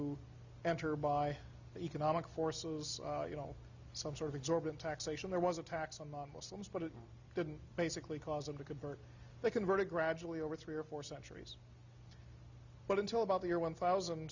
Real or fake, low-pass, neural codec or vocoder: real; 7.2 kHz; none